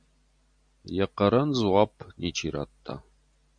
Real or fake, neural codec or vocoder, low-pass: real; none; 9.9 kHz